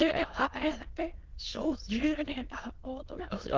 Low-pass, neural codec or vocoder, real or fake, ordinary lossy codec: 7.2 kHz; autoencoder, 22.05 kHz, a latent of 192 numbers a frame, VITS, trained on many speakers; fake; Opus, 16 kbps